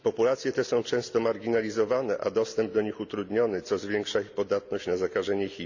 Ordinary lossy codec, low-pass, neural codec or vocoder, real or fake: none; 7.2 kHz; none; real